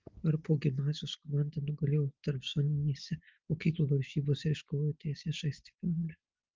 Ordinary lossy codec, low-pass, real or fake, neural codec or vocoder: Opus, 32 kbps; 7.2 kHz; real; none